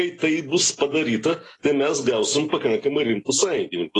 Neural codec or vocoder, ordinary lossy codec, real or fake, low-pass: none; AAC, 32 kbps; real; 10.8 kHz